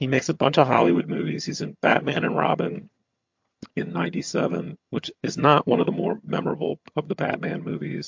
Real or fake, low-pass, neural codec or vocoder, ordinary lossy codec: fake; 7.2 kHz; vocoder, 22.05 kHz, 80 mel bands, HiFi-GAN; MP3, 48 kbps